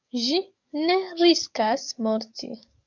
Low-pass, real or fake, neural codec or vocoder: 7.2 kHz; fake; codec, 44.1 kHz, 7.8 kbps, DAC